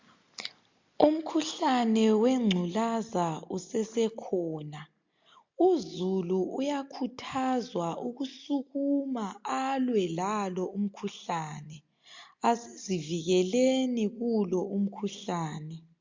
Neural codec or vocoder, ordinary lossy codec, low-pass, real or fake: none; MP3, 48 kbps; 7.2 kHz; real